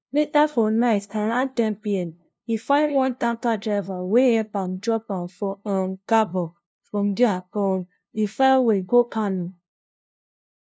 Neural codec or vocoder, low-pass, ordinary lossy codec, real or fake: codec, 16 kHz, 0.5 kbps, FunCodec, trained on LibriTTS, 25 frames a second; none; none; fake